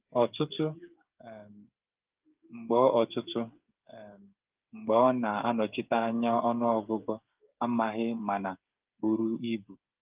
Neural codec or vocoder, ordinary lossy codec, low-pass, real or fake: codec, 16 kHz, 8 kbps, FreqCodec, smaller model; Opus, 24 kbps; 3.6 kHz; fake